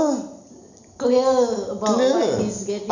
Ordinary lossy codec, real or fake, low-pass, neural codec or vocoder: none; real; 7.2 kHz; none